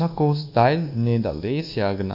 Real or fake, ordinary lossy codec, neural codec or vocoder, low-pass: fake; none; codec, 24 kHz, 1.2 kbps, DualCodec; 5.4 kHz